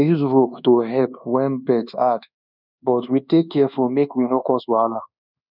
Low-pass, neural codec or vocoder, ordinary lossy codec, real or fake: 5.4 kHz; codec, 24 kHz, 1.2 kbps, DualCodec; none; fake